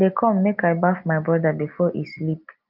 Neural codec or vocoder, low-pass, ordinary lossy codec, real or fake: none; 5.4 kHz; Opus, 24 kbps; real